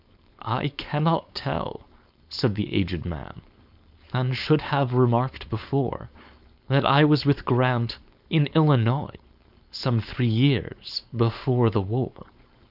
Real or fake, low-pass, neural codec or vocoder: fake; 5.4 kHz; codec, 16 kHz, 4.8 kbps, FACodec